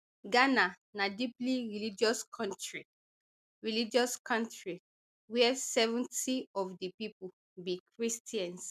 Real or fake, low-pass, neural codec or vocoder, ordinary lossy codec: real; 14.4 kHz; none; MP3, 96 kbps